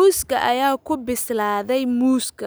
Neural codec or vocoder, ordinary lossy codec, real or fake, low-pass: none; none; real; none